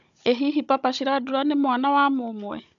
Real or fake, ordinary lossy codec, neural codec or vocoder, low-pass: fake; none; codec, 16 kHz, 16 kbps, FunCodec, trained on Chinese and English, 50 frames a second; 7.2 kHz